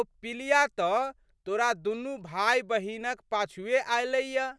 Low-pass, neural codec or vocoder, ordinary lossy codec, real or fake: none; none; none; real